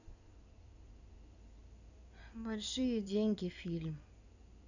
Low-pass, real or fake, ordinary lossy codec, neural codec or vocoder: 7.2 kHz; fake; none; codec, 16 kHz in and 24 kHz out, 2.2 kbps, FireRedTTS-2 codec